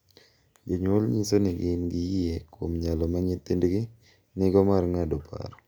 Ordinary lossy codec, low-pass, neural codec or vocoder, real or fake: none; none; none; real